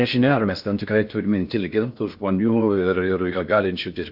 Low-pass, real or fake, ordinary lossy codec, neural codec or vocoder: 5.4 kHz; fake; AAC, 48 kbps; codec, 16 kHz in and 24 kHz out, 0.6 kbps, FocalCodec, streaming, 2048 codes